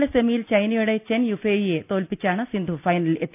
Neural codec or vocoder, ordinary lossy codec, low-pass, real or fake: none; none; 3.6 kHz; real